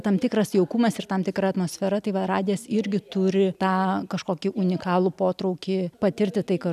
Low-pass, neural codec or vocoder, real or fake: 14.4 kHz; none; real